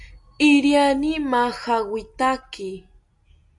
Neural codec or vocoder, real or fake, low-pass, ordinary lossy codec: none; real; 10.8 kHz; MP3, 64 kbps